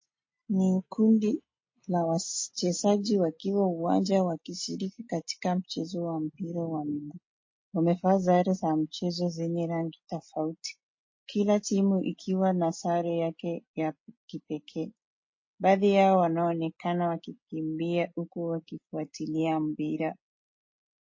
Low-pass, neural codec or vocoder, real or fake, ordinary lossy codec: 7.2 kHz; none; real; MP3, 32 kbps